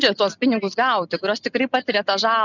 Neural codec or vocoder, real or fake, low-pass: none; real; 7.2 kHz